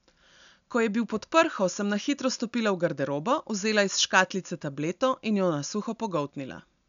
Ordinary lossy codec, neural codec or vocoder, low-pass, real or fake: none; none; 7.2 kHz; real